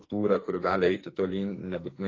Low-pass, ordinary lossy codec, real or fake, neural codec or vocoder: 7.2 kHz; AAC, 32 kbps; fake; codec, 44.1 kHz, 2.6 kbps, SNAC